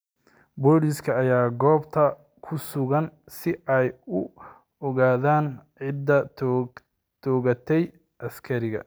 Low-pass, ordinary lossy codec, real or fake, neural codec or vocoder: none; none; real; none